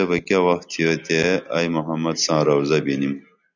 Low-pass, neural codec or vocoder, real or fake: 7.2 kHz; none; real